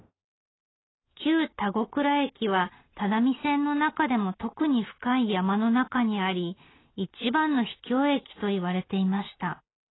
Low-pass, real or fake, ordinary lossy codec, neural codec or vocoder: 7.2 kHz; real; AAC, 16 kbps; none